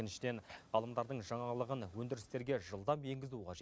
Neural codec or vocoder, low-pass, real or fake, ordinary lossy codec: none; none; real; none